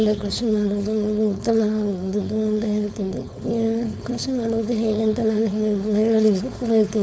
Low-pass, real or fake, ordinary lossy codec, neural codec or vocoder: none; fake; none; codec, 16 kHz, 4.8 kbps, FACodec